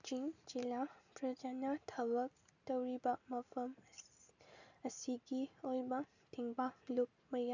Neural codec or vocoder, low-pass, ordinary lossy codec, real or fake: none; 7.2 kHz; none; real